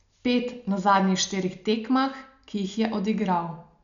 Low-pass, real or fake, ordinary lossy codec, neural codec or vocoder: 7.2 kHz; real; none; none